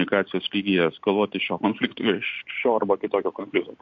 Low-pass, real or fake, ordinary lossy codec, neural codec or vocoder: 7.2 kHz; real; MP3, 48 kbps; none